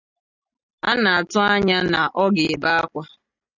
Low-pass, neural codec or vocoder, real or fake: 7.2 kHz; none; real